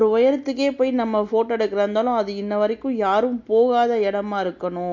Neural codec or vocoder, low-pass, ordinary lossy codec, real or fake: none; 7.2 kHz; MP3, 48 kbps; real